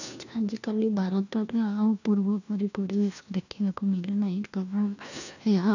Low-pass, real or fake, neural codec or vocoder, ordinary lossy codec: 7.2 kHz; fake; codec, 16 kHz, 1 kbps, FunCodec, trained on LibriTTS, 50 frames a second; none